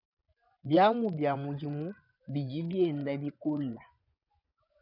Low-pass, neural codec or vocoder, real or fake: 5.4 kHz; vocoder, 24 kHz, 100 mel bands, Vocos; fake